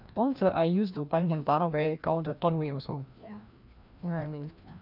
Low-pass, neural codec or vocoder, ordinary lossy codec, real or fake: 5.4 kHz; codec, 16 kHz, 1 kbps, FreqCodec, larger model; none; fake